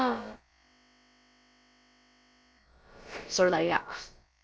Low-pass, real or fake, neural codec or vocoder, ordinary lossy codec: none; fake; codec, 16 kHz, about 1 kbps, DyCAST, with the encoder's durations; none